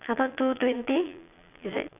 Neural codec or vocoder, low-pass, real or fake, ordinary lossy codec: vocoder, 22.05 kHz, 80 mel bands, Vocos; 3.6 kHz; fake; none